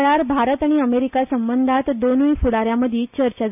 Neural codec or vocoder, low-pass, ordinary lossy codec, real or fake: none; 3.6 kHz; none; real